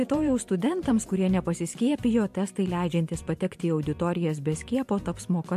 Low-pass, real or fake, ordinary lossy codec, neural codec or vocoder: 14.4 kHz; fake; MP3, 64 kbps; vocoder, 44.1 kHz, 128 mel bands every 512 samples, BigVGAN v2